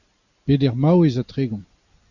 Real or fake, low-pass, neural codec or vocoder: real; 7.2 kHz; none